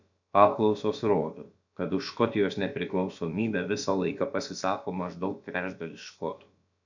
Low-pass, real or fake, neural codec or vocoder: 7.2 kHz; fake; codec, 16 kHz, about 1 kbps, DyCAST, with the encoder's durations